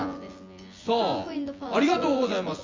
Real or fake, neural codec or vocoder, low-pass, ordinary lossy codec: fake; vocoder, 24 kHz, 100 mel bands, Vocos; 7.2 kHz; Opus, 32 kbps